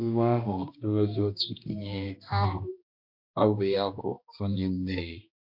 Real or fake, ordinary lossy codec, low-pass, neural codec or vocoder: fake; MP3, 48 kbps; 5.4 kHz; codec, 16 kHz, 1 kbps, X-Codec, HuBERT features, trained on balanced general audio